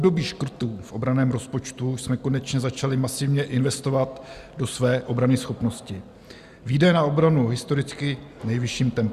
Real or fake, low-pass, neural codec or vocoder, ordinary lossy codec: real; 14.4 kHz; none; Opus, 64 kbps